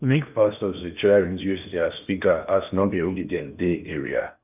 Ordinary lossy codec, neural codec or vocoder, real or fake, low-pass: none; codec, 16 kHz in and 24 kHz out, 0.8 kbps, FocalCodec, streaming, 65536 codes; fake; 3.6 kHz